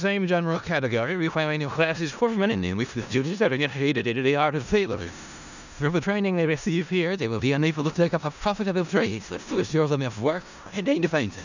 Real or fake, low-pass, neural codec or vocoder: fake; 7.2 kHz; codec, 16 kHz in and 24 kHz out, 0.4 kbps, LongCat-Audio-Codec, four codebook decoder